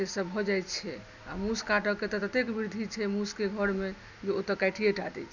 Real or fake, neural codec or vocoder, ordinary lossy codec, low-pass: real; none; none; 7.2 kHz